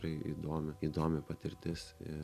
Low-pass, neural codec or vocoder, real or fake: 14.4 kHz; none; real